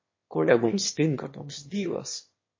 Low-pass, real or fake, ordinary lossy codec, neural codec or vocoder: 7.2 kHz; fake; MP3, 32 kbps; autoencoder, 22.05 kHz, a latent of 192 numbers a frame, VITS, trained on one speaker